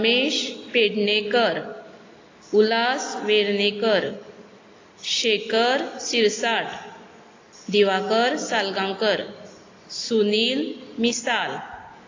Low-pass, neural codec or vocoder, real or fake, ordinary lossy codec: 7.2 kHz; none; real; AAC, 32 kbps